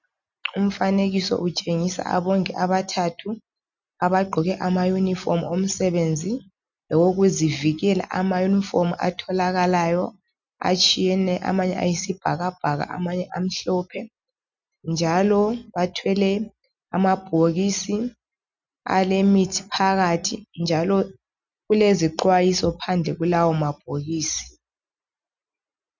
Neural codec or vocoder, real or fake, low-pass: none; real; 7.2 kHz